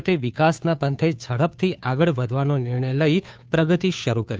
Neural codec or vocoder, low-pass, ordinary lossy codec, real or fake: codec, 16 kHz, 2 kbps, FunCodec, trained on Chinese and English, 25 frames a second; none; none; fake